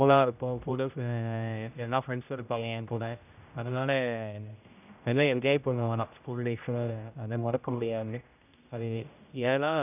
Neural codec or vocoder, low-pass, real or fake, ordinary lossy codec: codec, 16 kHz, 0.5 kbps, X-Codec, HuBERT features, trained on general audio; 3.6 kHz; fake; none